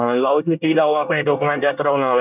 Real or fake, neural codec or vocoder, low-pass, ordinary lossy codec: fake; codec, 24 kHz, 1 kbps, SNAC; 3.6 kHz; none